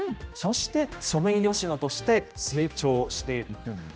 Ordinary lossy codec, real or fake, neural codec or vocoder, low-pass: none; fake; codec, 16 kHz, 1 kbps, X-Codec, HuBERT features, trained on general audio; none